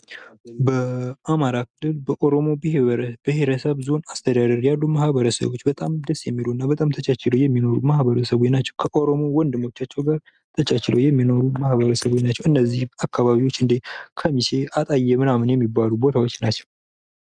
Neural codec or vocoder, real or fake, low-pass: none; real; 9.9 kHz